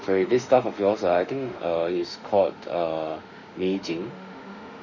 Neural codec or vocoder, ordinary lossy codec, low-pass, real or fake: autoencoder, 48 kHz, 32 numbers a frame, DAC-VAE, trained on Japanese speech; none; 7.2 kHz; fake